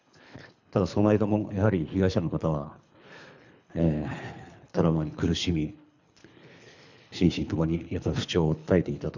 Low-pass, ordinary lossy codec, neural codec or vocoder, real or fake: 7.2 kHz; none; codec, 24 kHz, 3 kbps, HILCodec; fake